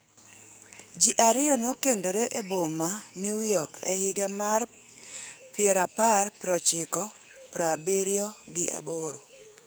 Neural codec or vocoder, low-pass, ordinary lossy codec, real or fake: codec, 44.1 kHz, 2.6 kbps, SNAC; none; none; fake